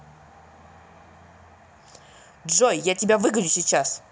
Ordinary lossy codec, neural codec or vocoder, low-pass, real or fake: none; none; none; real